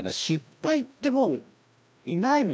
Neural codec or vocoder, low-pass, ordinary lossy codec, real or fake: codec, 16 kHz, 1 kbps, FreqCodec, larger model; none; none; fake